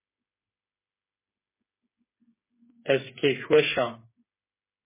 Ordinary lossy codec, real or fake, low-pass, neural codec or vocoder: MP3, 16 kbps; fake; 3.6 kHz; codec, 16 kHz, 8 kbps, FreqCodec, smaller model